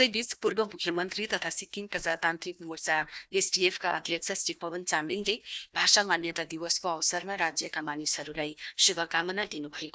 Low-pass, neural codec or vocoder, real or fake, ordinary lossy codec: none; codec, 16 kHz, 1 kbps, FunCodec, trained on Chinese and English, 50 frames a second; fake; none